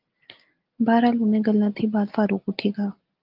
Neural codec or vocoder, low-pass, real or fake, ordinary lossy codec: none; 5.4 kHz; real; Opus, 32 kbps